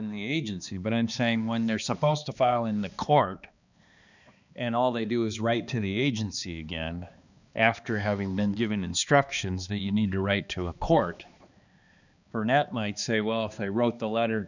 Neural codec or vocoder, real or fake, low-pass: codec, 16 kHz, 2 kbps, X-Codec, HuBERT features, trained on balanced general audio; fake; 7.2 kHz